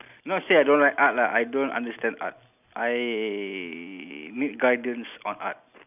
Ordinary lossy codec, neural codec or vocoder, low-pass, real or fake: none; none; 3.6 kHz; real